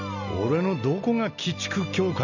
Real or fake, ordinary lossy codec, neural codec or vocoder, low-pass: real; none; none; 7.2 kHz